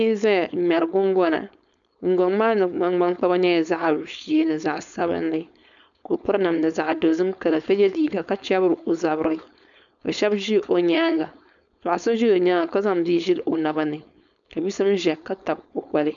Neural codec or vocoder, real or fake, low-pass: codec, 16 kHz, 4.8 kbps, FACodec; fake; 7.2 kHz